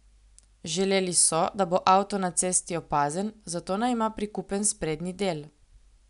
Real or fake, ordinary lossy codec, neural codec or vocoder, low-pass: real; none; none; 10.8 kHz